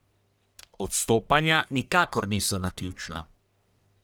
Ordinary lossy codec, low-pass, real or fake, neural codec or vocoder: none; none; fake; codec, 44.1 kHz, 1.7 kbps, Pupu-Codec